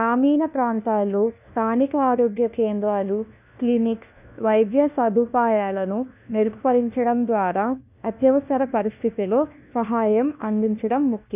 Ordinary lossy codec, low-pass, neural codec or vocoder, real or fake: none; 3.6 kHz; codec, 16 kHz, 1 kbps, FunCodec, trained on LibriTTS, 50 frames a second; fake